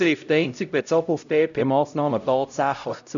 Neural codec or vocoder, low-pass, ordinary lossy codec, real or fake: codec, 16 kHz, 0.5 kbps, X-Codec, HuBERT features, trained on LibriSpeech; 7.2 kHz; none; fake